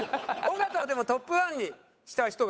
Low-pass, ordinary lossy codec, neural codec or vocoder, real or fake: none; none; codec, 16 kHz, 8 kbps, FunCodec, trained on Chinese and English, 25 frames a second; fake